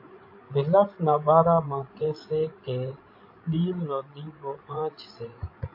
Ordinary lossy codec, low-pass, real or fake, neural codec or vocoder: MP3, 32 kbps; 5.4 kHz; fake; codec, 16 kHz, 16 kbps, FreqCodec, larger model